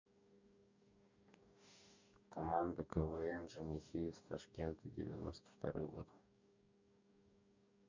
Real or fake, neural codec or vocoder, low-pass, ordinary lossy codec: fake; codec, 44.1 kHz, 2.6 kbps, DAC; 7.2 kHz; MP3, 64 kbps